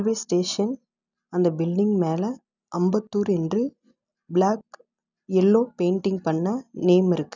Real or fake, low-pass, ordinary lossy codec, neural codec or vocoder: real; 7.2 kHz; none; none